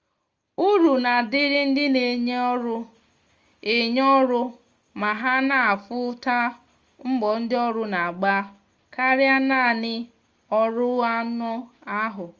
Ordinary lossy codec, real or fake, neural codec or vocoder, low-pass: Opus, 32 kbps; real; none; 7.2 kHz